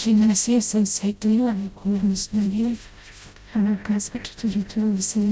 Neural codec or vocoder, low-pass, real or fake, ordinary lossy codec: codec, 16 kHz, 0.5 kbps, FreqCodec, smaller model; none; fake; none